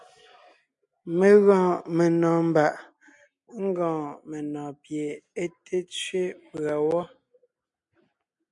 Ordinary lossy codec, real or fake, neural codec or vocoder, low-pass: MP3, 96 kbps; real; none; 10.8 kHz